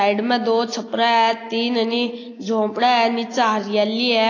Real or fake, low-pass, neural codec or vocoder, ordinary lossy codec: real; 7.2 kHz; none; AAC, 32 kbps